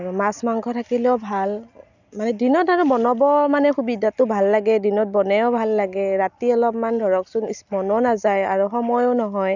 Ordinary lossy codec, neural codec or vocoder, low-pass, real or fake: none; none; 7.2 kHz; real